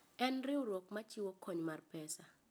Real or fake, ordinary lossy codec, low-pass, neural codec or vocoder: real; none; none; none